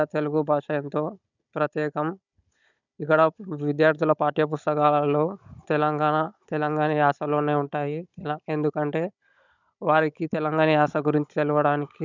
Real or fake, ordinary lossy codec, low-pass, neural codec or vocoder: fake; none; 7.2 kHz; codec, 16 kHz, 16 kbps, FunCodec, trained on Chinese and English, 50 frames a second